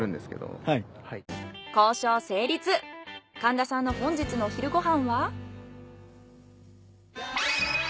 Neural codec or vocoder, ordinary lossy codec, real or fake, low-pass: none; none; real; none